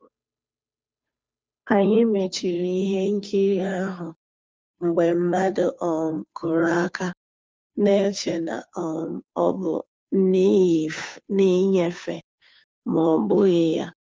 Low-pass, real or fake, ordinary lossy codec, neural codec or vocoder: none; fake; none; codec, 16 kHz, 2 kbps, FunCodec, trained on Chinese and English, 25 frames a second